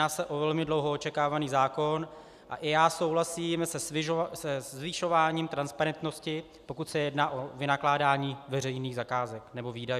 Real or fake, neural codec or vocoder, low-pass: real; none; 14.4 kHz